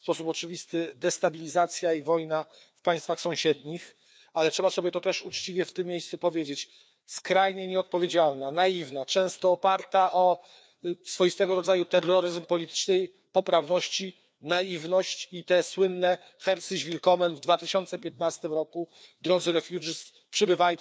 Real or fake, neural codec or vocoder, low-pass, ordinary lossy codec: fake; codec, 16 kHz, 2 kbps, FreqCodec, larger model; none; none